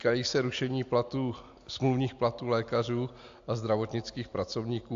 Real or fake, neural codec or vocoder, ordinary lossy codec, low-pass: real; none; AAC, 64 kbps; 7.2 kHz